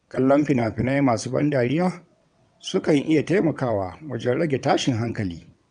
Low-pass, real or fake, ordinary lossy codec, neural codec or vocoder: 9.9 kHz; fake; none; vocoder, 22.05 kHz, 80 mel bands, WaveNeXt